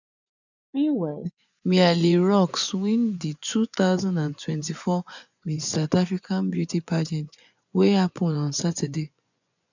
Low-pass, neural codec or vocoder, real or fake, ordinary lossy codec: 7.2 kHz; vocoder, 24 kHz, 100 mel bands, Vocos; fake; none